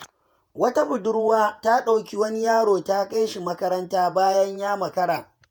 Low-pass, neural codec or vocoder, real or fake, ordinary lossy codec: none; vocoder, 48 kHz, 128 mel bands, Vocos; fake; none